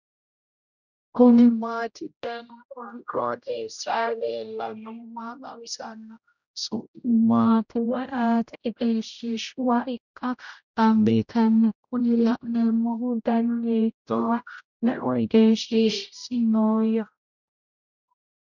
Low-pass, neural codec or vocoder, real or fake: 7.2 kHz; codec, 16 kHz, 0.5 kbps, X-Codec, HuBERT features, trained on general audio; fake